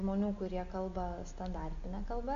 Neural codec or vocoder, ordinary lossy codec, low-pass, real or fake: none; AAC, 48 kbps; 7.2 kHz; real